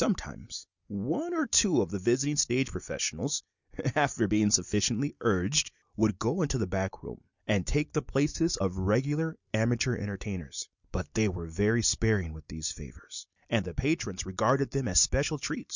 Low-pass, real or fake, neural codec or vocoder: 7.2 kHz; real; none